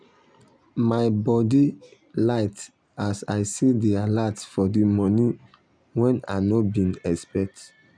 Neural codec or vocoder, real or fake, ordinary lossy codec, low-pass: none; real; none; 9.9 kHz